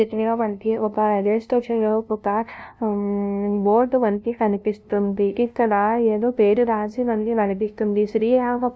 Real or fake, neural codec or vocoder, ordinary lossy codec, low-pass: fake; codec, 16 kHz, 0.5 kbps, FunCodec, trained on LibriTTS, 25 frames a second; none; none